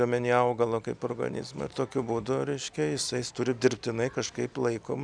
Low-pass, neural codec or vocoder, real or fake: 9.9 kHz; none; real